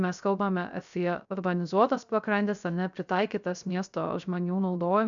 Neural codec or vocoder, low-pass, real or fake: codec, 16 kHz, 0.3 kbps, FocalCodec; 7.2 kHz; fake